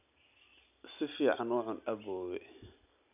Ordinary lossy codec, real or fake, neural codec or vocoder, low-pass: none; real; none; 3.6 kHz